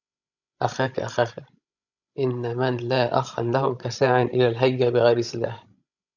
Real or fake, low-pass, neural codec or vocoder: fake; 7.2 kHz; codec, 16 kHz, 16 kbps, FreqCodec, larger model